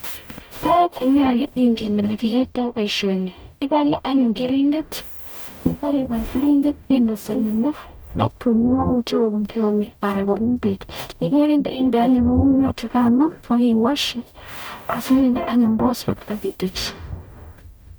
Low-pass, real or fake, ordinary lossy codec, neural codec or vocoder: none; fake; none; codec, 44.1 kHz, 0.9 kbps, DAC